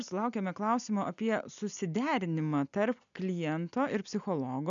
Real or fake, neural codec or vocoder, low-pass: real; none; 7.2 kHz